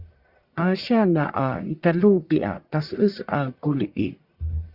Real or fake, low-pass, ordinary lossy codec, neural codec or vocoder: fake; 5.4 kHz; Opus, 64 kbps; codec, 44.1 kHz, 1.7 kbps, Pupu-Codec